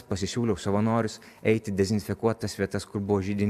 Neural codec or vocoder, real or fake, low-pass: none; real; 14.4 kHz